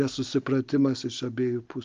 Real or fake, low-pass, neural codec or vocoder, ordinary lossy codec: real; 7.2 kHz; none; Opus, 32 kbps